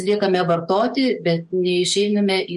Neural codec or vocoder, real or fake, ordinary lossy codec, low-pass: codec, 44.1 kHz, 7.8 kbps, DAC; fake; MP3, 48 kbps; 14.4 kHz